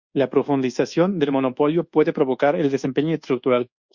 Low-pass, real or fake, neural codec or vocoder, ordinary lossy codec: 7.2 kHz; fake; codec, 24 kHz, 1.2 kbps, DualCodec; Opus, 64 kbps